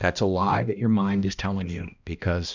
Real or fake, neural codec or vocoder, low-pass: fake; codec, 16 kHz, 1 kbps, X-Codec, HuBERT features, trained on balanced general audio; 7.2 kHz